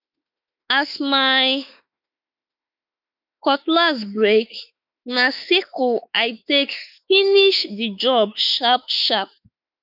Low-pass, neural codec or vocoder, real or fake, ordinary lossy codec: 5.4 kHz; autoencoder, 48 kHz, 32 numbers a frame, DAC-VAE, trained on Japanese speech; fake; none